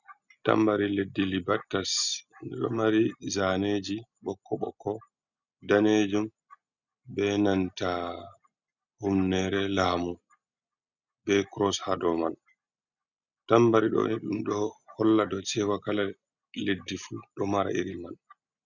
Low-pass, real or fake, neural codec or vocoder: 7.2 kHz; real; none